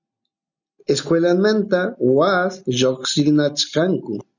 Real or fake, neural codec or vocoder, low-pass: real; none; 7.2 kHz